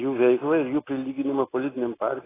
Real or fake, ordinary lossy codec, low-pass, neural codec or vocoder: real; AAC, 16 kbps; 3.6 kHz; none